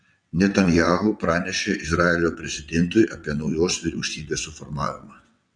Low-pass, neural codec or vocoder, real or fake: 9.9 kHz; vocoder, 22.05 kHz, 80 mel bands, WaveNeXt; fake